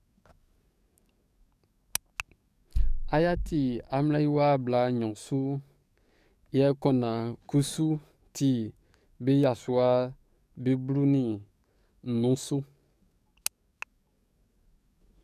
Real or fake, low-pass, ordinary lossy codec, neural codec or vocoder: fake; 14.4 kHz; none; codec, 44.1 kHz, 7.8 kbps, DAC